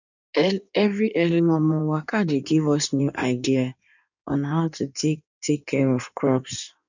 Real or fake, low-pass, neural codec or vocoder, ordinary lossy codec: fake; 7.2 kHz; codec, 16 kHz in and 24 kHz out, 1.1 kbps, FireRedTTS-2 codec; none